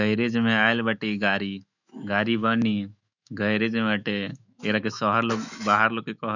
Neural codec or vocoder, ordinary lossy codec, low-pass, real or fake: autoencoder, 48 kHz, 128 numbers a frame, DAC-VAE, trained on Japanese speech; none; 7.2 kHz; fake